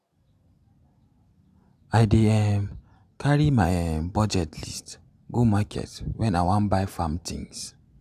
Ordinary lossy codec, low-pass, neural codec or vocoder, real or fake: none; 14.4 kHz; none; real